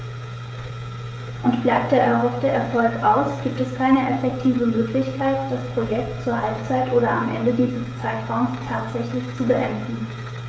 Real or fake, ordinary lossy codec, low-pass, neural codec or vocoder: fake; none; none; codec, 16 kHz, 16 kbps, FreqCodec, smaller model